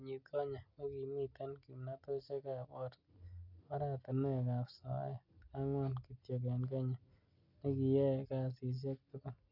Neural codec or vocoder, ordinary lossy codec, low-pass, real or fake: none; none; 5.4 kHz; real